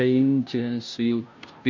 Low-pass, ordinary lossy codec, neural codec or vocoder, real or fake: 7.2 kHz; MP3, 48 kbps; codec, 16 kHz, 0.5 kbps, FunCodec, trained on Chinese and English, 25 frames a second; fake